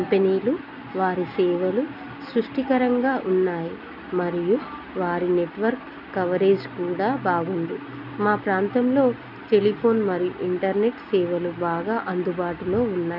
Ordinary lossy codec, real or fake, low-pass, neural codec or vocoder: none; real; 5.4 kHz; none